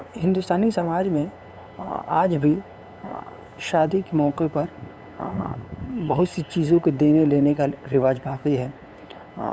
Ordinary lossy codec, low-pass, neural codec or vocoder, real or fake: none; none; codec, 16 kHz, 8 kbps, FunCodec, trained on LibriTTS, 25 frames a second; fake